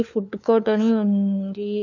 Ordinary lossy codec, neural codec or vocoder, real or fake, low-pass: none; codec, 16 kHz, 4 kbps, FunCodec, trained on LibriTTS, 50 frames a second; fake; 7.2 kHz